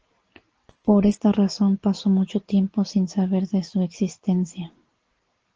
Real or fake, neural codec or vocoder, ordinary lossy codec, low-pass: real; none; Opus, 16 kbps; 7.2 kHz